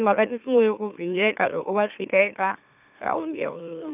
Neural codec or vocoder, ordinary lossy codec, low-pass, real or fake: autoencoder, 44.1 kHz, a latent of 192 numbers a frame, MeloTTS; none; 3.6 kHz; fake